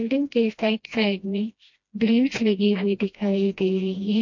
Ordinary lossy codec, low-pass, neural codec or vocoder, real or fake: MP3, 48 kbps; 7.2 kHz; codec, 16 kHz, 1 kbps, FreqCodec, smaller model; fake